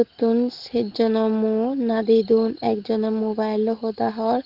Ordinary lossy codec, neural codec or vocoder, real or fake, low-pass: Opus, 16 kbps; none; real; 5.4 kHz